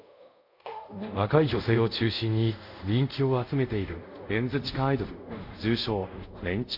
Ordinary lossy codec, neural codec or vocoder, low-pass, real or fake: MP3, 48 kbps; codec, 24 kHz, 0.5 kbps, DualCodec; 5.4 kHz; fake